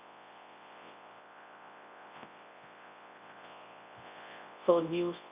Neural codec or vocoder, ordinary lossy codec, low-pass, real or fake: codec, 24 kHz, 0.9 kbps, WavTokenizer, large speech release; Opus, 64 kbps; 3.6 kHz; fake